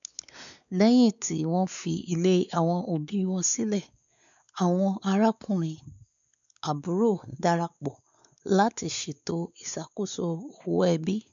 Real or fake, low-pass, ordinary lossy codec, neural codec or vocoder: fake; 7.2 kHz; none; codec, 16 kHz, 4 kbps, X-Codec, WavLM features, trained on Multilingual LibriSpeech